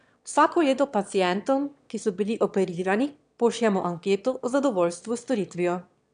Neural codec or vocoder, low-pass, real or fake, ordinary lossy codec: autoencoder, 22.05 kHz, a latent of 192 numbers a frame, VITS, trained on one speaker; 9.9 kHz; fake; none